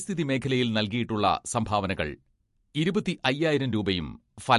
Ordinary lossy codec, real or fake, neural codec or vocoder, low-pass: MP3, 48 kbps; real; none; 14.4 kHz